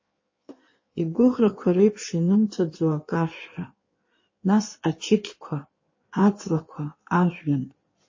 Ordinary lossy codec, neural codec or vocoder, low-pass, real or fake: MP3, 32 kbps; codec, 16 kHz in and 24 kHz out, 1.1 kbps, FireRedTTS-2 codec; 7.2 kHz; fake